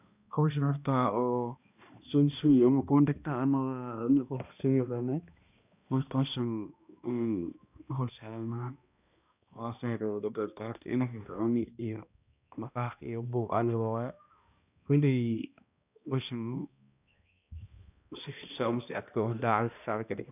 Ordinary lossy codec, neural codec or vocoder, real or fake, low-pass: none; codec, 16 kHz, 1 kbps, X-Codec, HuBERT features, trained on balanced general audio; fake; 3.6 kHz